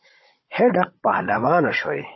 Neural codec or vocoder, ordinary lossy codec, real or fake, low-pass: codec, 16 kHz, 16 kbps, FunCodec, trained on Chinese and English, 50 frames a second; MP3, 24 kbps; fake; 7.2 kHz